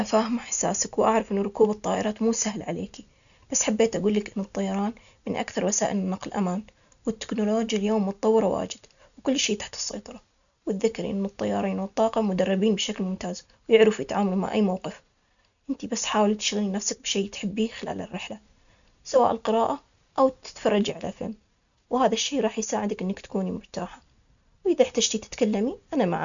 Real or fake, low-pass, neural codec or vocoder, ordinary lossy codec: real; 7.2 kHz; none; none